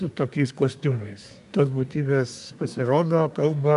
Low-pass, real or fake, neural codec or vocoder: 10.8 kHz; fake; codec, 24 kHz, 1 kbps, SNAC